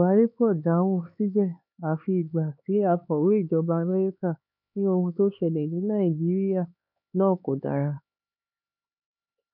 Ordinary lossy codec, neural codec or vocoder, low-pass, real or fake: none; codec, 16 kHz, 4 kbps, X-Codec, HuBERT features, trained on LibriSpeech; 5.4 kHz; fake